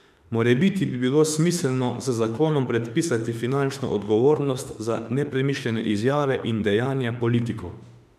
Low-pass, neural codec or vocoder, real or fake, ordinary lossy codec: 14.4 kHz; autoencoder, 48 kHz, 32 numbers a frame, DAC-VAE, trained on Japanese speech; fake; none